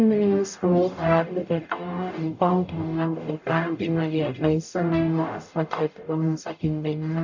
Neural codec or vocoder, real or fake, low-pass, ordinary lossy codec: codec, 44.1 kHz, 0.9 kbps, DAC; fake; 7.2 kHz; none